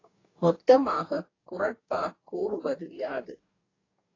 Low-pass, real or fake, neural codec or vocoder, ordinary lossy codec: 7.2 kHz; fake; codec, 44.1 kHz, 2.6 kbps, DAC; AAC, 32 kbps